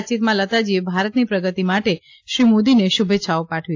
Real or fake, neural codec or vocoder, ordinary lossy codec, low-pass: real; none; AAC, 48 kbps; 7.2 kHz